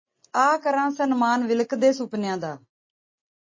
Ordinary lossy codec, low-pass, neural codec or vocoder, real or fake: MP3, 32 kbps; 7.2 kHz; none; real